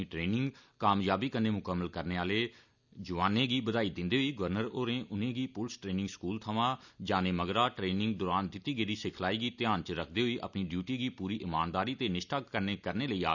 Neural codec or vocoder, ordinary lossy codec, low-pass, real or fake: none; none; 7.2 kHz; real